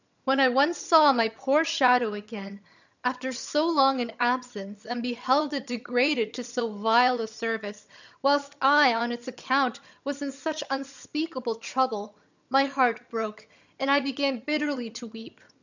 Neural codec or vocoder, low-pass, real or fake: vocoder, 22.05 kHz, 80 mel bands, HiFi-GAN; 7.2 kHz; fake